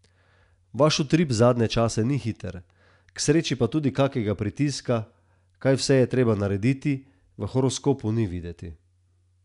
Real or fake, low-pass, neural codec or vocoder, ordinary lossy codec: real; 10.8 kHz; none; none